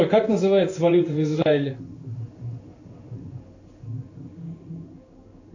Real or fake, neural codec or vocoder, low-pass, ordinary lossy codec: fake; codec, 16 kHz in and 24 kHz out, 1 kbps, XY-Tokenizer; 7.2 kHz; AAC, 48 kbps